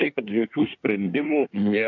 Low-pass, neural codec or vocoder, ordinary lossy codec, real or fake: 7.2 kHz; codec, 24 kHz, 1 kbps, SNAC; AAC, 48 kbps; fake